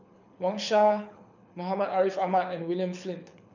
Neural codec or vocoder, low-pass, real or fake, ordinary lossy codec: codec, 24 kHz, 6 kbps, HILCodec; 7.2 kHz; fake; none